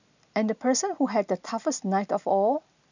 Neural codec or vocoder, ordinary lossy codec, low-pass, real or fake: none; none; 7.2 kHz; real